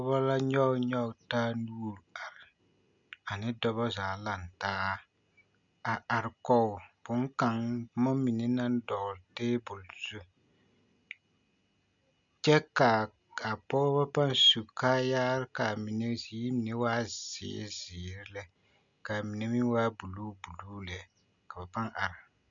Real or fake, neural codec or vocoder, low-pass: real; none; 7.2 kHz